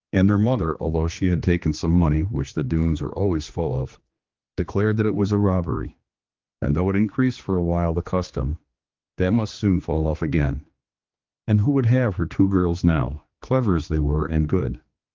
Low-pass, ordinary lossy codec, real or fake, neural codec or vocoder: 7.2 kHz; Opus, 32 kbps; fake; codec, 16 kHz, 2 kbps, X-Codec, HuBERT features, trained on general audio